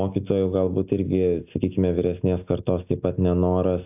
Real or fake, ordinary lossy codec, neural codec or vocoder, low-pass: real; AAC, 32 kbps; none; 3.6 kHz